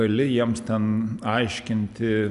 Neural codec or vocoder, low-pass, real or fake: none; 10.8 kHz; real